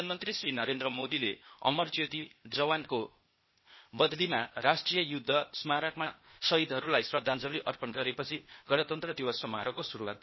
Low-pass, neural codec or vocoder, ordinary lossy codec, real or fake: 7.2 kHz; codec, 16 kHz, 0.8 kbps, ZipCodec; MP3, 24 kbps; fake